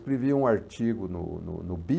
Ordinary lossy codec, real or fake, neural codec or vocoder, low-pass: none; real; none; none